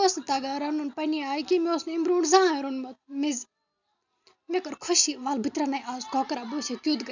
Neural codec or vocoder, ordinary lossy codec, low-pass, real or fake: none; none; 7.2 kHz; real